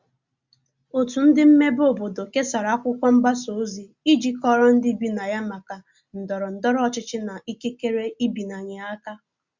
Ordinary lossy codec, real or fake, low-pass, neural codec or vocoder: Opus, 64 kbps; real; 7.2 kHz; none